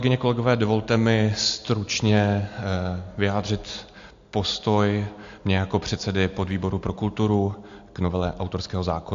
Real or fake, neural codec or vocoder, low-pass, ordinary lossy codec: real; none; 7.2 kHz; AAC, 64 kbps